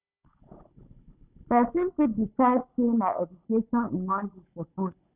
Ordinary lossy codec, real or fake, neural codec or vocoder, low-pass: none; fake; codec, 16 kHz, 16 kbps, FunCodec, trained on Chinese and English, 50 frames a second; 3.6 kHz